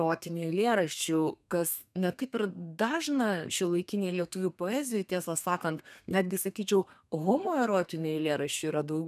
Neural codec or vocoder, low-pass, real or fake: codec, 32 kHz, 1.9 kbps, SNAC; 14.4 kHz; fake